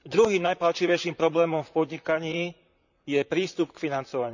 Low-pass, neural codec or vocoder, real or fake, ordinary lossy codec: 7.2 kHz; vocoder, 44.1 kHz, 128 mel bands, Pupu-Vocoder; fake; none